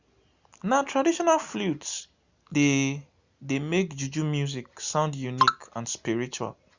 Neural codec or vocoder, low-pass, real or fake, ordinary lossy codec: none; 7.2 kHz; real; Opus, 64 kbps